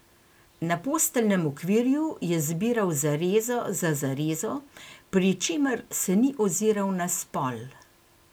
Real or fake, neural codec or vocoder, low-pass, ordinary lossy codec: real; none; none; none